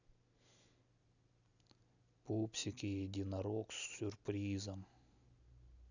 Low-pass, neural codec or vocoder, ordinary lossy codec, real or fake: 7.2 kHz; none; none; real